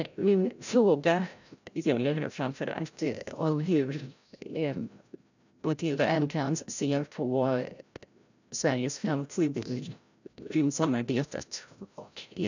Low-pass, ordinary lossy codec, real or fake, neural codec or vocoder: 7.2 kHz; none; fake; codec, 16 kHz, 0.5 kbps, FreqCodec, larger model